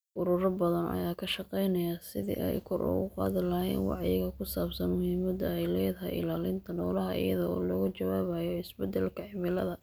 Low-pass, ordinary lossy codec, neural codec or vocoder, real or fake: none; none; none; real